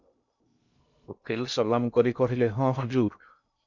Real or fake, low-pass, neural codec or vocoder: fake; 7.2 kHz; codec, 16 kHz in and 24 kHz out, 0.6 kbps, FocalCodec, streaming, 2048 codes